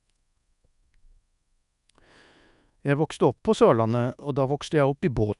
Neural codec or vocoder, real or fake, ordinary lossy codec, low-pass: codec, 24 kHz, 1.2 kbps, DualCodec; fake; none; 10.8 kHz